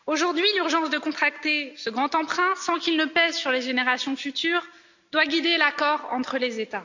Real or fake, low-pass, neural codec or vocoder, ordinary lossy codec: real; 7.2 kHz; none; none